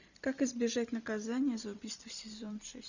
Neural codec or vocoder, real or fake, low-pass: vocoder, 44.1 kHz, 128 mel bands every 256 samples, BigVGAN v2; fake; 7.2 kHz